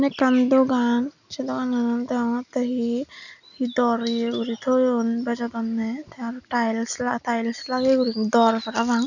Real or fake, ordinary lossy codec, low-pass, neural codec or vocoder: real; none; 7.2 kHz; none